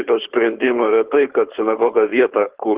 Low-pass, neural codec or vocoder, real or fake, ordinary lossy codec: 3.6 kHz; codec, 16 kHz, 4.8 kbps, FACodec; fake; Opus, 16 kbps